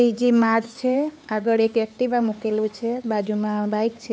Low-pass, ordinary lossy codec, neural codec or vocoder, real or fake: none; none; codec, 16 kHz, 4 kbps, X-Codec, HuBERT features, trained on LibriSpeech; fake